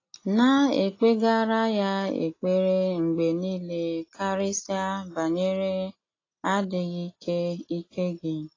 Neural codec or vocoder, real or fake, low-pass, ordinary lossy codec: none; real; 7.2 kHz; AAC, 32 kbps